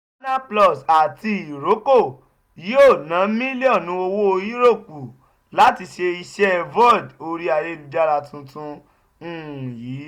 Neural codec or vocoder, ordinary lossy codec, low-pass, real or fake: none; none; 19.8 kHz; real